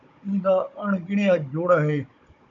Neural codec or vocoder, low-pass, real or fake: codec, 16 kHz, 16 kbps, FunCodec, trained on Chinese and English, 50 frames a second; 7.2 kHz; fake